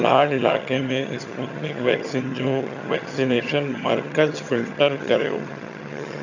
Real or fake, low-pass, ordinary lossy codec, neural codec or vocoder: fake; 7.2 kHz; none; vocoder, 22.05 kHz, 80 mel bands, HiFi-GAN